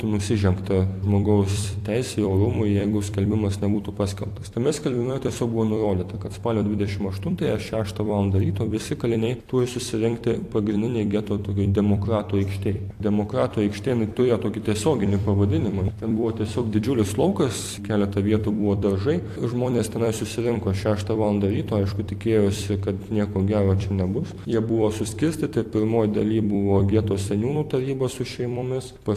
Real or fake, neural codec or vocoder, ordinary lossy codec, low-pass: fake; vocoder, 44.1 kHz, 128 mel bands every 256 samples, BigVGAN v2; AAC, 64 kbps; 14.4 kHz